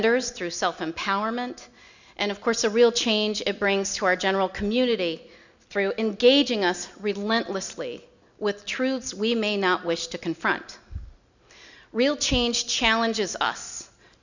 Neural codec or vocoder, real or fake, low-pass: none; real; 7.2 kHz